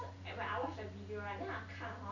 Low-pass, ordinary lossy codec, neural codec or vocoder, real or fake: 7.2 kHz; none; none; real